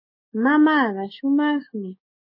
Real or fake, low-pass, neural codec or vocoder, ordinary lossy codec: fake; 5.4 kHz; codec, 16 kHz, 6 kbps, DAC; MP3, 24 kbps